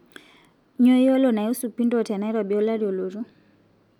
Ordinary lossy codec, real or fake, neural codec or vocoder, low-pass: none; real; none; 19.8 kHz